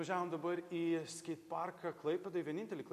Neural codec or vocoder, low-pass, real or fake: none; 14.4 kHz; real